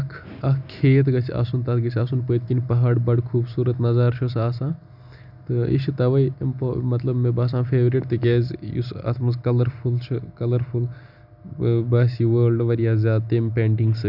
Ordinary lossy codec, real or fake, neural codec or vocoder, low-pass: none; real; none; 5.4 kHz